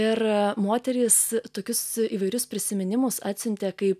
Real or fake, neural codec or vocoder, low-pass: real; none; 14.4 kHz